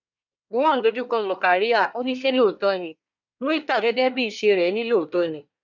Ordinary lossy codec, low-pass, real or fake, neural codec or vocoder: none; 7.2 kHz; fake; codec, 24 kHz, 1 kbps, SNAC